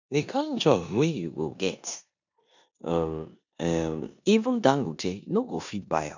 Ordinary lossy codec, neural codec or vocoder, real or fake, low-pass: none; codec, 16 kHz in and 24 kHz out, 0.9 kbps, LongCat-Audio-Codec, four codebook decoder; fake; 7.2 kHz